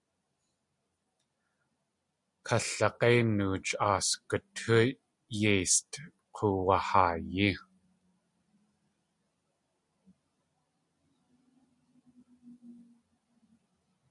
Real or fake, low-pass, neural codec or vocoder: real; 10.8 kHz; none